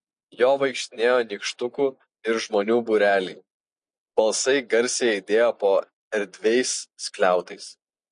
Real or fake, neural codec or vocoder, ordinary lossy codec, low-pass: real; none; MP3, 48 kbps; 9.9 kHz